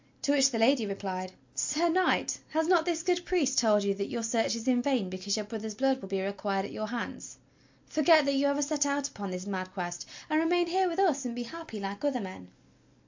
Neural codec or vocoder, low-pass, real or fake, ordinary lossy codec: none; 7.2 kHz; real; MP3, 48 kbps